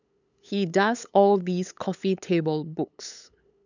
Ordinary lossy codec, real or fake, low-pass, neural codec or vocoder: none; fake; 7.2 kHz; codec, 16 kHz, 8 kbps, FunCodec, trained on LibriTTS, 25 frames a second